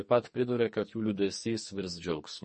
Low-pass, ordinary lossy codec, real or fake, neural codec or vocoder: 10.8 kHz; MP3, 32 kbps; fake; codec, 44.1 kHz, 2.6 kbps, SNAC